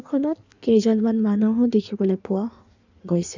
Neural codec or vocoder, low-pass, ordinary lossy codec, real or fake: codec, 16 kHz in and 24 kHz out, 1.1 kbps, FireRedTTS-2 codec; 7.2 kHz; none; fake